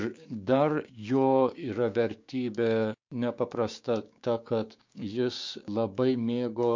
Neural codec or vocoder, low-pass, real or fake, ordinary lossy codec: codec, 16 kHz, 6 kbps, DAC; 7.2 kHz; fake; MP3, 48 kbps